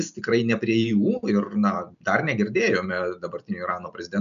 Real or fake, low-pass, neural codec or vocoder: real; 7.2 kHz; none